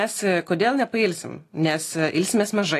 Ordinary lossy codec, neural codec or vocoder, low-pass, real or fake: AAC, 48 kbps; none; 14.4 kHz; real